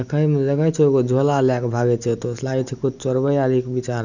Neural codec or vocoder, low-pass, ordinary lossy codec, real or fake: none; 7.2 kHz; none; real